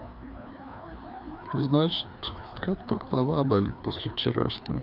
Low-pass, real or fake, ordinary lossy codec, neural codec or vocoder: 5.4 kHz; fake; none; codec, 16 kHz, 2 kbps, FreqCodec, larger model